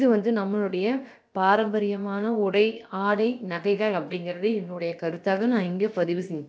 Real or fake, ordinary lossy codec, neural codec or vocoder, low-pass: fake; none; codec, 16 kHz, about 1 kbps, DyCAST, with the encoder's durations; none